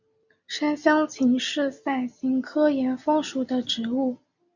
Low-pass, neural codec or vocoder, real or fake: 7.2 kHz; none; real